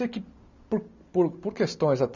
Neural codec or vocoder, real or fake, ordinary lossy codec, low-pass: none; real; none; 7.2 kHz